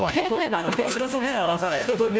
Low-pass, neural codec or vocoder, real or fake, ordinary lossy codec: none; codec, 16 kHz, 1 kbps, FunCodec, trained on LibriTTS, 50 frames a second; fake; none